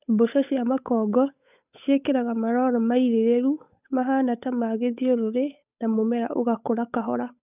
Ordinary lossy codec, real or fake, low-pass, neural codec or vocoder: none; fake; 3.6 kHz; codec, 16 kHz, 8 kbps, FunCodec, trained on Chinese and English, 25 frames a second